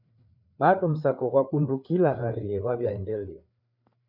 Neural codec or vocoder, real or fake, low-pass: codec, 16 kHz, 4 kbps, FreqCodec, larger model; fake; 5.4 kHz